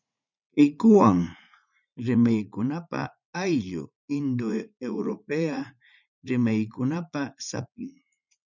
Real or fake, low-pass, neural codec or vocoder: fake; 7.2 kHz; vocoder, 44.1 kHz, 80 mel bands, Vocos